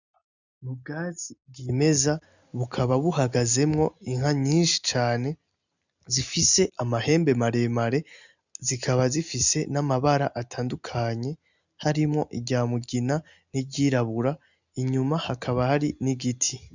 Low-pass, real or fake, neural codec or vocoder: 7.2 kHz; real; none